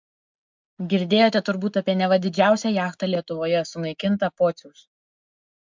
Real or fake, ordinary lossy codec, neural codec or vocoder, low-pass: real; MP3, 64 kbps; none; 7.2 kHz